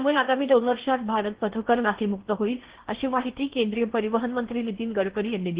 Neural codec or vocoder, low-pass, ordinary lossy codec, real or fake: codec, 16 kHz in and 24 kHz out, 0.8 kbps, FocalCodec, streaming, 65536 codes; 3.6 kHz; Opus, 16 kbps; fake